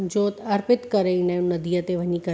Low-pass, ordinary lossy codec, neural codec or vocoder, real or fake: none; none; none; real